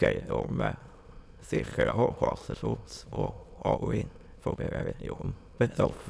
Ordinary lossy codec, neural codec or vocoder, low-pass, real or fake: none; autoencoder, 22.05 kHz, a latent of 192 numbers a frame, VITS, trained on many speakers; none; fake